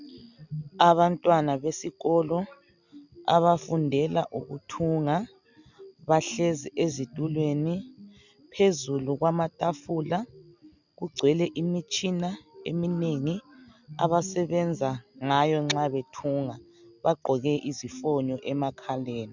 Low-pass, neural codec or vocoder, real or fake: 7.2 kHz; none; real